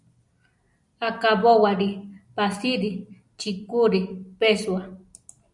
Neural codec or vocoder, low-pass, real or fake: none; 10.8 kHz; real